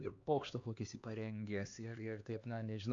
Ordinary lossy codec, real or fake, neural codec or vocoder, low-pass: Opus, 64 kbps; fake; codec, 16 kHz, 2 kbps, X-Codec, HuBERT features, trained on LibriSpeech; 7.2 kHz